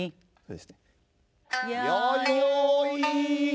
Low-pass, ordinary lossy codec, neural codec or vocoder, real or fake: none; none; none; real